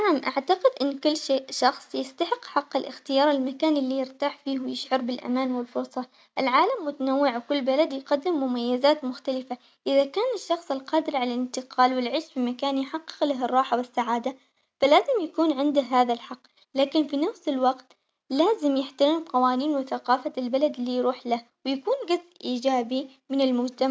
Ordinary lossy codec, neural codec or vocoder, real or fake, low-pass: none; none; real; none